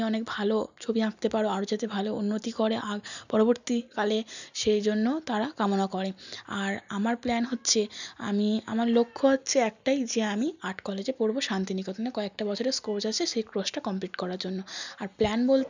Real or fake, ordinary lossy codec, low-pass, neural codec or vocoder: real; none; 7.2 kHz; none